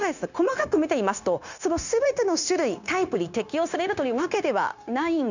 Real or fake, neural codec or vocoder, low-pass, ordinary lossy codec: fake; codec, 16 kHz, 0.9 kbps, LongCat-Audio-Codec; 7.2 kHz; none